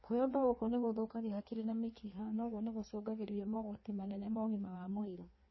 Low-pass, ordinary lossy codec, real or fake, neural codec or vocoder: 7.2 kHz; MP3, 24 kbps; fake; codec, 16 kHz in and 24 kHz out, 1.1 kbps, FireRedTTS-2 codec